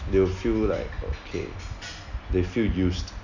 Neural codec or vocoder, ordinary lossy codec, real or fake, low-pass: none; none; real; 7.2 kHz